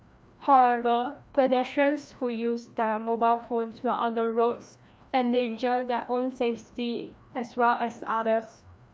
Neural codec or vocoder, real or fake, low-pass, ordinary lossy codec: codec, 16 kHz, 1 kbps, FreqCodec, larger model; fake; none; none